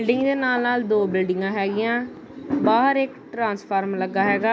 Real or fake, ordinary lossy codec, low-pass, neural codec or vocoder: real; none; none; none